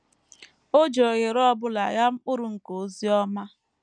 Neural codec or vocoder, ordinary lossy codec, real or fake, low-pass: none; none; real; 9.9 kHz